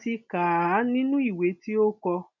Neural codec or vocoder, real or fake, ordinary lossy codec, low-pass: none; real; MP3, 64 kbps; 7.2 kHz